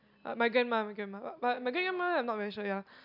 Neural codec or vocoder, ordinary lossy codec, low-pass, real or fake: none; none; 5.4 kHz; real